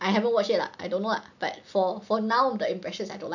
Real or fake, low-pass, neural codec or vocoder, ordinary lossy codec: real; 7.2 kHz; none; none